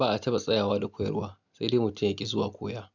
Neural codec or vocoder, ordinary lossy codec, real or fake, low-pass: none; none; real; 7.2 kHz